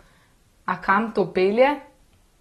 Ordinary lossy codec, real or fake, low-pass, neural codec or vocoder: AAC, 32 kbps; real; 19.8 kHz; none